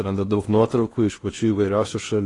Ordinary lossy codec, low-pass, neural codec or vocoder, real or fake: AAC, 32 kbps; 10.8 kHz; codec, 16 kHz in and 24 kHz out, 0.8 kbps, FocalCodec, streaming, 65536 codes; fake